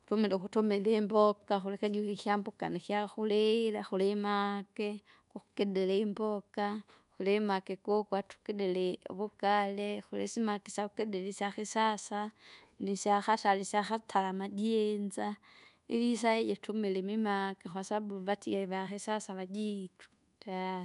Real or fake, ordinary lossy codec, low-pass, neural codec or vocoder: fake; none; 10.8 kHz; codec, 24 kHz, 1.2 kbps, DualCodec